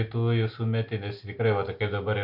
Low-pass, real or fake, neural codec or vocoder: 5.4 kHz; real; none